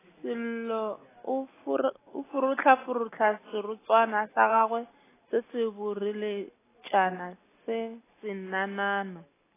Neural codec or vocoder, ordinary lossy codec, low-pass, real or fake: none; AAC, 16 kbps; 3.6 kHz; real